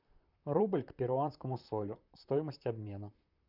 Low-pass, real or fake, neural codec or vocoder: 5.4 kHz; fake; vocoder, 44.1 kHz, 128 mel bands, Pupu-Vocoder